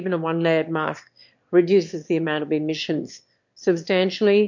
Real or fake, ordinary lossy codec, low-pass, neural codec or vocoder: fake; MP3, 48 kbps; 7.2 kHz; autoencoder, 22.05 kHz, a latent of 192 numbers a frame, VITS, trained on one speaker